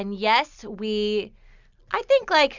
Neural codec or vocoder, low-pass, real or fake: none; 7.2 kHz; real